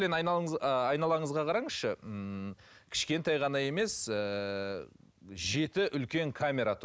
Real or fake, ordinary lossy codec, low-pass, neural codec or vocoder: real; none; none; none